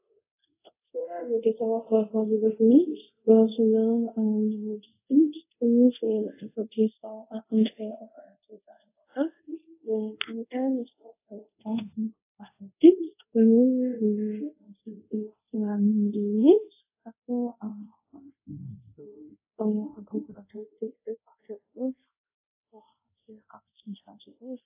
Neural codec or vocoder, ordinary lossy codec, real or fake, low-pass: codec, 24 kHz, 0.5 kbps, DualCodec; AAC, 24 kbps; fake; 3.6 kHz